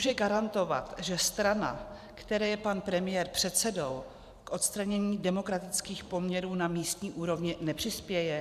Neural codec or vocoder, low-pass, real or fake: vocoder, 44.1 kHz, 128 mel bands every 512 samples, BigVGAN v2; 14.4 kHz; fake